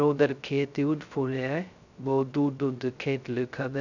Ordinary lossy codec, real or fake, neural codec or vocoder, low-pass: none; fake; codec, 16 kHz, 0.2 kbps, FocalCodec; 7.2 kHz